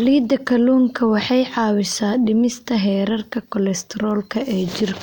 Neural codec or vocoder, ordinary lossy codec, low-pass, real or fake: none; none; 19.8 kHz; real